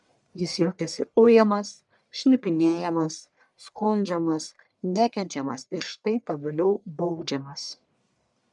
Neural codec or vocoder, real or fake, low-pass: codec, 44.1 kHz, 1.7 kbps, Pupu-Codec; fake; 10.8 kHz